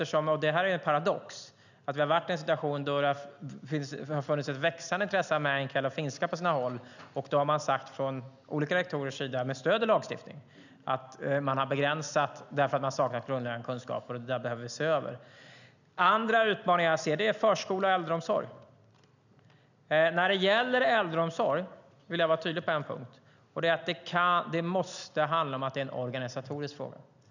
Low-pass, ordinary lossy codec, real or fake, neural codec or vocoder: 7.2 kHz; none; real; none